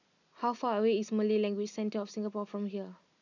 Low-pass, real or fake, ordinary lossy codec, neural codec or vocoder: 7.2 kHz; real; none; none